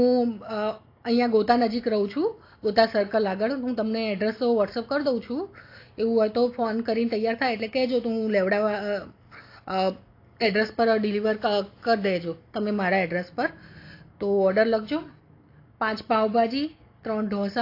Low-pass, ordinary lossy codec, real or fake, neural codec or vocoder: 5.4 kHz; AAC, 32 kbps; real; none